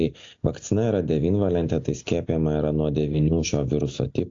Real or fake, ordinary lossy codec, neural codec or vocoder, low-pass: real; AAC, 48 kbps; none; 7.2 kHz